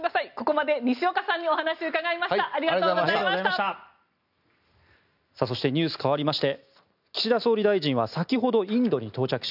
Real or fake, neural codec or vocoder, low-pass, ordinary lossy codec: real; none; 5.4 kHz; none